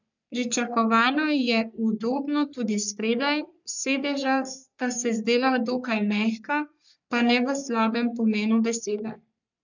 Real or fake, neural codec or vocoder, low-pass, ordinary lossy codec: fake; codec, 44.1 kHz, 3.4 kbps, Pupu-Codec; 7.2 kHz; none